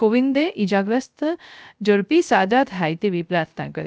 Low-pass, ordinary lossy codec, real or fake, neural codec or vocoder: none; none; fake; codec, 16 kHz, 0.3 kbps, FocalCodec